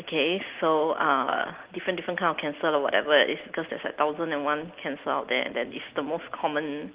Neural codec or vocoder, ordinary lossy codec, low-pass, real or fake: none; Opus, 16 kbps; 3.6 kHz; real